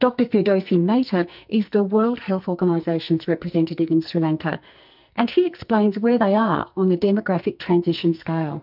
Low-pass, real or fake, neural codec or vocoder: 5.4 kHz; fake; codec, 44.1 kHz, 2.6 kbps, SNAC